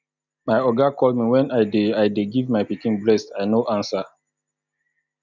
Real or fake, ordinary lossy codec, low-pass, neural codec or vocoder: real; none; 7.2 kHz; none